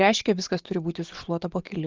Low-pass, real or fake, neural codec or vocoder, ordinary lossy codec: 7.2 kHz; real; none; Opus, 16 kbps